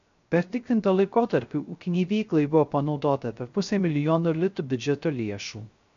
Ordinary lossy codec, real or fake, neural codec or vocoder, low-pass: MP3, 64 kbps; fake; codec, 16 kHz, 0.3 kbps, FocalCodec; 7.2 kHz